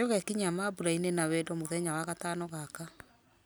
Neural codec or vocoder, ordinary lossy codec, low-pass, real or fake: none; none; none; real